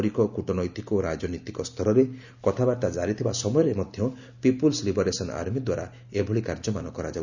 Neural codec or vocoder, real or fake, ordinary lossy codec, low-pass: none; real; none; 7.2 kHz